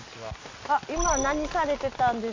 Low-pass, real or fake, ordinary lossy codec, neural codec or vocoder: 7.2 kHz; real; MP3, 64 kbps; none